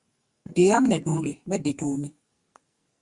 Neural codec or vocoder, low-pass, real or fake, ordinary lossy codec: codec, 44.1 kHz, 2.6 kbps, SNAC; 10.8 kHz; fake; Opus, 64 kbps